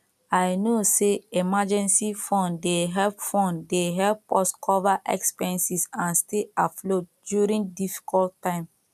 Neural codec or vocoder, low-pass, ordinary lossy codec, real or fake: none; 14.4 kHz; none; real